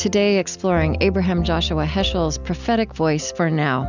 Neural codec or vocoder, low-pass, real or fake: none; 7.2 kHz; real